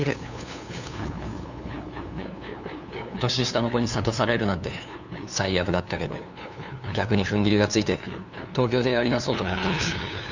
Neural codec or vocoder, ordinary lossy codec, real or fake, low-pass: codec, 16 kHz, 2 kbps, FunCodec, trained on LibriTTS, 25 frames a second; none; fake; 7.2 kHz